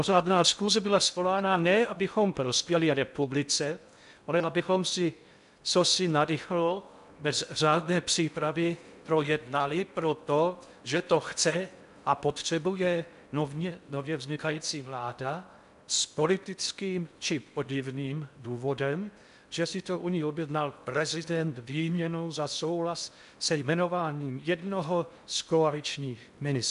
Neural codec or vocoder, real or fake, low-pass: codec, 16 kHz in and 24 kHz out, 0.6 kbps, FocalCodec, streaming, 4096 codes; fake; 10.8 kHz